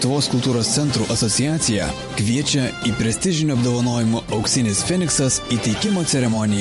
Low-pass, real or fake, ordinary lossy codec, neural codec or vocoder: 14.4 kHz; fake; MP3, 48 kbps; vocoder, 44.1 kHz, 128 mel bands every 512 samples, BigVGAN v2